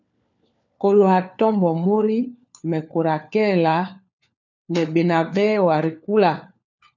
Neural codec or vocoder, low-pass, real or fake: codec, 16 kHz, 4 kbps, FunCodec, trained on LibriTTS, 50 frames a second; 7.2 kHz; fake